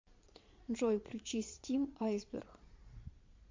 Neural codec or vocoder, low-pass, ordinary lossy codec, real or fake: vocoder, 22.05 kHz, 80 mel bands, Vocos; 7.2 kHz; MP3, 48 kbps; fake